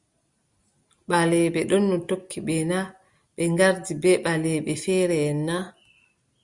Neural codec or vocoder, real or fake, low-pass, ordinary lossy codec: none; real; 10.8 kHz; Opus, 64 kbps